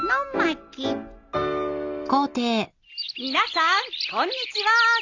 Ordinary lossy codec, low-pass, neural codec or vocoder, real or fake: Opus, 64 kbps; 7.2 kHz; none; real